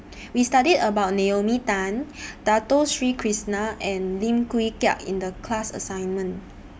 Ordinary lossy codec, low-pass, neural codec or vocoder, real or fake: none; none; none; real